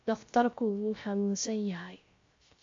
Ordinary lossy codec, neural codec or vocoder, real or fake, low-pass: AAC, 48 kbps; codec, 16 kHz, 0.3 kbps, FocalCodec; fake; 7.2 kHz